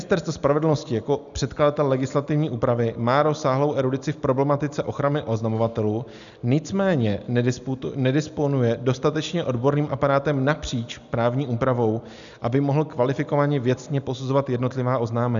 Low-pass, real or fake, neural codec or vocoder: 7.2 kHz; real; none